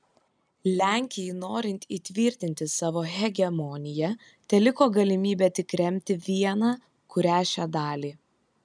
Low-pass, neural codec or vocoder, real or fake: 9.9 kHz; none; real